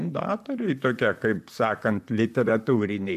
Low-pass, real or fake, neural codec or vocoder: 14.4 kHz; fake; vocoder, 44.1 kHz, 128 mel bands, Pupu-Vocoder